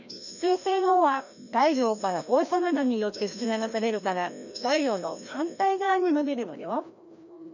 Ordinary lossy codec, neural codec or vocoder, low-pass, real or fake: none; codec, 16 kHz, 1 kbps, FreqCodec, larger model; 7.2 kHz; fake